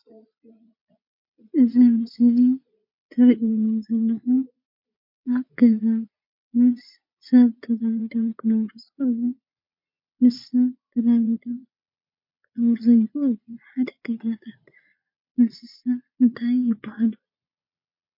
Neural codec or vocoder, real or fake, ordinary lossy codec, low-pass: vocoder, 22.05 kHz, 80 mel bands, Vocos; fake; MP3, 32 kbps; 5.4 kHz